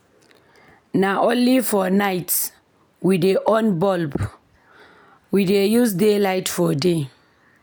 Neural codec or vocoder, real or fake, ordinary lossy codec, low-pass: none; real; none; none